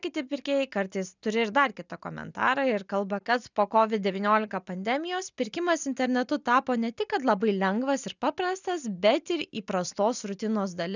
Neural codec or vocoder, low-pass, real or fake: none; 7.2 kHz; real